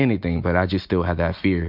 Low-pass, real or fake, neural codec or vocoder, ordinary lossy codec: 5.4 kHz; real; none; MP3, 48 kbps